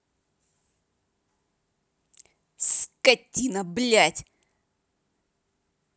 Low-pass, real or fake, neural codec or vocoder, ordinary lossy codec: none; real; none; none